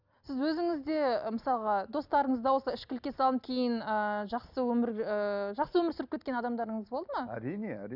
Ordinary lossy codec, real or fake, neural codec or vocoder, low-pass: none; real; none; 5.4 kHz